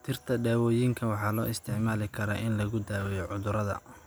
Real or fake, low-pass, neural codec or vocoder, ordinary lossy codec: real; none; none; none